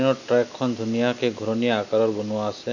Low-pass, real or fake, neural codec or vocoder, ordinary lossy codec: 7.2 kHz; real; none; none